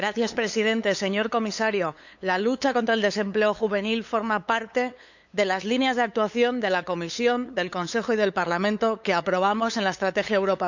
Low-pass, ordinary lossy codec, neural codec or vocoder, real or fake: 7.2 kHz; none; codec, 16 kHz, 8 kbps, FunCodec, trained on LibriTTS, 25 frames a second; fake